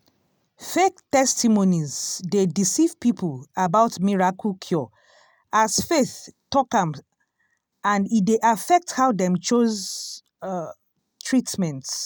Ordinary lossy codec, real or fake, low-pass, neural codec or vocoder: none; real; none; none